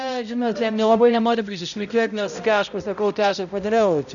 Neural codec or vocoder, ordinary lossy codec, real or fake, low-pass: codec, 16 kHz, 0.5 kbps, X-Codec, HuBERT features, trained on balanced general audio; MP3, 96 kbps; fake; 7.2 kHz